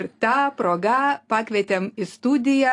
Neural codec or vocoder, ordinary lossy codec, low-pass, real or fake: none; AAC, 48 kbps; 10.8 kHz; real